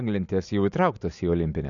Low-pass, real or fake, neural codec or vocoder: 7.2 kHz; real; none